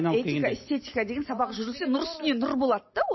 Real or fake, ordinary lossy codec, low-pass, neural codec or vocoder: real; MP3, 24 kbps; 7.2 kHz; none